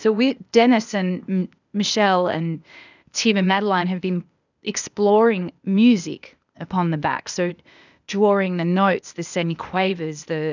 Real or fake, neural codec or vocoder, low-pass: fake; codec, 16 kHz, 0.8 kbps, ZipCodec; 7.2 kHz